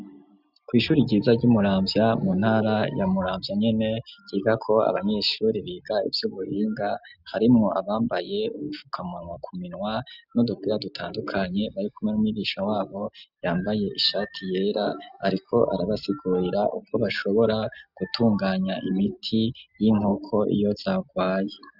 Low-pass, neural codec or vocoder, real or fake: 5.4 kHz; none; real